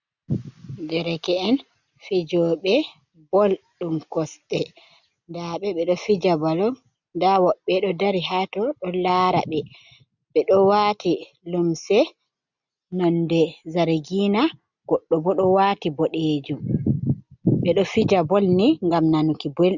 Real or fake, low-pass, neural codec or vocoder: real; 7.2 kHz; none